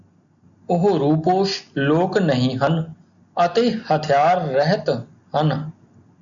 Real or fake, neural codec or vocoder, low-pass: real; none; 7.2 kHz